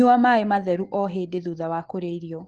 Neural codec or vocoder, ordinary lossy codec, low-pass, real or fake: autoencoder, 48 kHz, 128 numbers a frame, DAC-VAE, trained on Japanese speech; Opus, 16 kbps; 10.8 kHz; fake